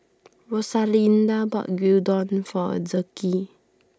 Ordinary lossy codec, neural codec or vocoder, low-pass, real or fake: none; none; none; real